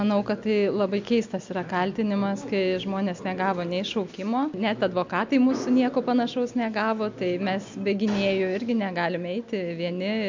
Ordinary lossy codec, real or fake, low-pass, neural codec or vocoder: MP3, 64 kbps; real; 7.2 kHz; none